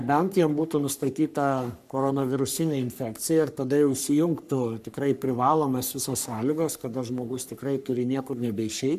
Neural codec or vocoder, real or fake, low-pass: codec, 44.1 kHz, 3.4 kbps, Pupu-Codec; fake; 14.4 kHz